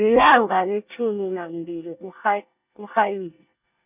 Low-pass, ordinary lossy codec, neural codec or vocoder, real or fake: 3.6 kHz; none; codec, 24 kHz, 1 kbps, SNAC; fake